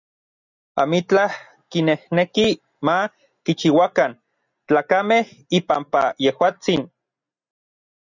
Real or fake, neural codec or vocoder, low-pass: real; none; 7.2 kHz